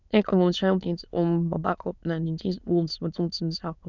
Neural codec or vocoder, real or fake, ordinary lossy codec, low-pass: autoencoder, 22.05 kHz, a latent of 192 numbers a frame, VITS, trained on many speakers; fake; none; 7.2 kHz